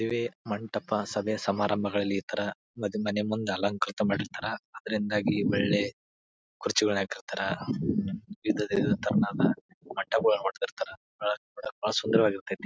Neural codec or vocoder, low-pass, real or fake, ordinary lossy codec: none; none; real; none